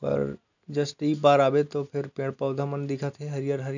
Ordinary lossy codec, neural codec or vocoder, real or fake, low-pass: none; none; real; 7.2 kHz